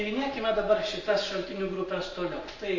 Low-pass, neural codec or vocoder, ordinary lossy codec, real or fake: 7.2 kHz; none; MP3, 32 kbps; real